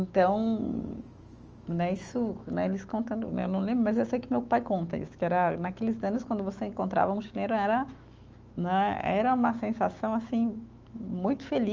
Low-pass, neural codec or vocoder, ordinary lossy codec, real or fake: 7.2 kHz; autoencoder, 48 kHz, 128 numbers a frame, DAC-VAE, trained on Japanese speech; Opus, 24 kbps; fake